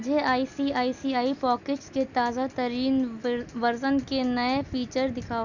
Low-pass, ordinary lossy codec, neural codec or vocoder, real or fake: 7.2 kHz; none; none; real